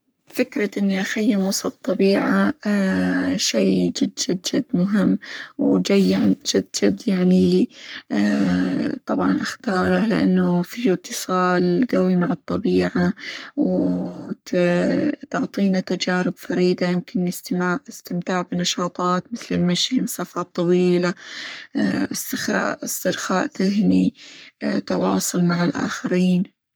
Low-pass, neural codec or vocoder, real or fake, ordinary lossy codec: none; codec, 44.1 kHz, 3.4 kbps, Pupu-Codec; fake; none